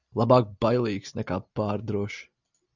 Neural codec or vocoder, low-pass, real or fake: none; 7.2 kHz; real